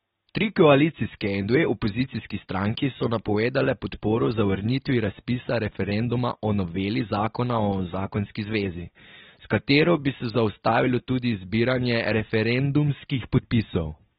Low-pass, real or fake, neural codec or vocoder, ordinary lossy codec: 19.8 kHz; real; none; AAC, 16 kbps